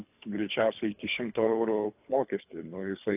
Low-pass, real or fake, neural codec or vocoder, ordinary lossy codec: 3.6 kHz; fake; codec, 24 kHz, 3 kbps, HILCodec; AAC, 32 kbps